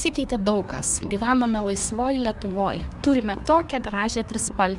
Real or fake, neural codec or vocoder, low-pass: fake; codec, 24 kHz, 1 kbps, SNAC; 10.8 kHz